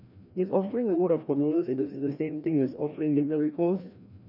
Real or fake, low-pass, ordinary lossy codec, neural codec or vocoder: fake; 5.4 kHz; none; codec, 16 kHz, 1 kbps, FreqCodec, larger model